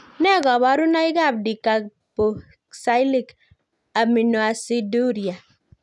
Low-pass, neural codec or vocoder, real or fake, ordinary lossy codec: 10.8 kHz; none; real; none